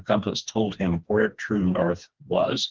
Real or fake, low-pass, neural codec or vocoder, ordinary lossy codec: fake; 7.2 kHz; codec, 16 kHz, 2 kbps, FreqCodec, smaller model; Opus, 16 kbps